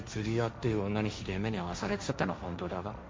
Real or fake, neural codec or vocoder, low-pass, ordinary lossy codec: fake; codec, 16 kHz, 1.1 kbps, Voila-Tokenizer; none; none